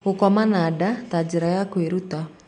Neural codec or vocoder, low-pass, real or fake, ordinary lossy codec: none; 9.9 kHz; real; MP3, 64 kbps